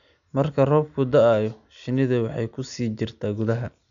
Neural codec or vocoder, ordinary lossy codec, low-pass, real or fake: none; none; 7.2 kHz; real